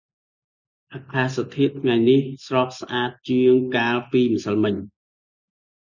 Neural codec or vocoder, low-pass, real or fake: none; 7.2 kHz; real